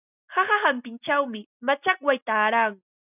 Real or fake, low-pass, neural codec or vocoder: real; 3.6 kHz; none